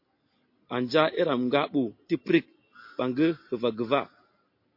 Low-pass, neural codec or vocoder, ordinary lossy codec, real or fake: 5.4 kHz; none; MP3, 32 kbps; real